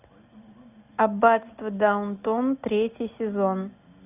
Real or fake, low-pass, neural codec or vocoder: real; 3.6 kHz; none